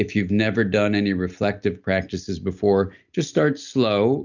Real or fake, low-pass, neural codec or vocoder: real; 7.2 kHz; none